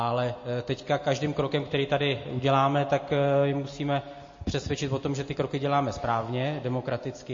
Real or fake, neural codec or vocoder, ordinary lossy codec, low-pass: real; none; MP3, 32 kbps; 7.2 kHz